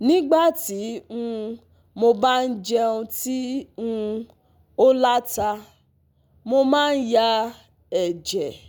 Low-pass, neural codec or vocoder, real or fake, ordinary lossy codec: none; none; real; none